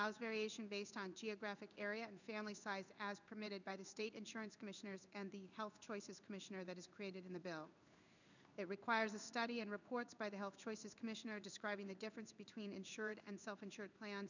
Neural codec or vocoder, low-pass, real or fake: codec, 16 kHz in and 24 kHz out, 1 kbps, XY-Tokenizer; 7.2 kHz; fake